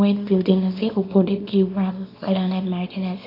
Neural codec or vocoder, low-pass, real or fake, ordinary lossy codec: codec, 24 kHz, 0.9 kbps, WavTokenizer, small release; 5.4 kHz; fake; AAC, 32 kbps